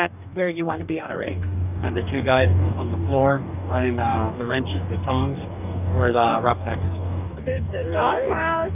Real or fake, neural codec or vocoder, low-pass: fake; codec, 44.1 kHz, 2.6 kbps, DAC; 3.6 kHz